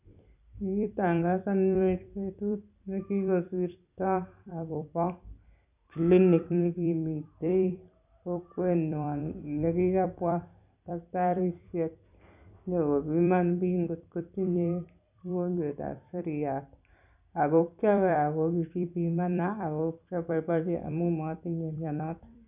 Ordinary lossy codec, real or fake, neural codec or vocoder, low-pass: none; fake; vocoder, 44.1 kHz, 128 mel bands every 256 samples, BigVGAN v2; 3.6 kHz